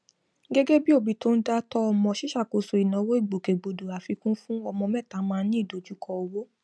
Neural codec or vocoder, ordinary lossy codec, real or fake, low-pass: none; none; real; none